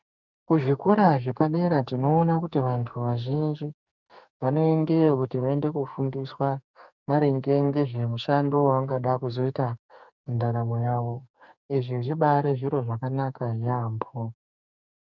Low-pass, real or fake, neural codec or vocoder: 7.2 kHz; fake; codec, 44.1 kHz, 2.6 kbps, SNAC